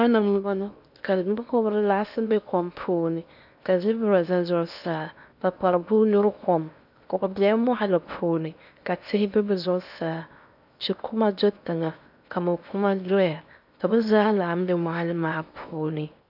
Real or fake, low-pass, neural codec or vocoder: fake; 5.4 kHz; codec, 16 kHz in and 24 kHz out, 0.8 kbps, FocalCodec, streaming, 65536 codes